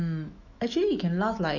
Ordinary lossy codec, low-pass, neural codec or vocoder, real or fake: none; 7.2 kHz; autoencoder, 48 kHz, 128 numbers a frame, DAC-VAE, trained on Japanese speech; fake